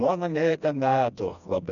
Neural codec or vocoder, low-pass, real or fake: codec, 16 kHz, 1 kbps, FreqCodec, smaller model; 7.2 kHz; fake